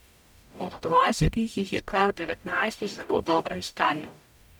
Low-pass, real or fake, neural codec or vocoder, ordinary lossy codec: 19.8 kHz; fake; codec, 44.1 kHz, 0.9 kbps, DAC; none